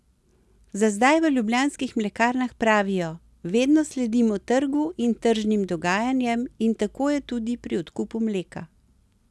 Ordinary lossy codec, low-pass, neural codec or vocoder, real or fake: none; none; none; real